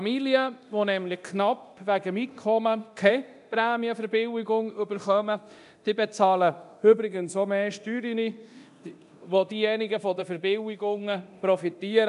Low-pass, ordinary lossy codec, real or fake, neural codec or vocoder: 10.8 kHz; none; fake; codec, 24 kHz, 0.9 kbps, DualCodec